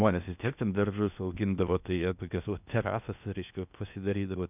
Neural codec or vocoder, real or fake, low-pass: codec, 16 kHz in and 24 kHz out, 0.8 kbps, FocalCodec, streaming, 65536 codes; fake; 3.6 kHz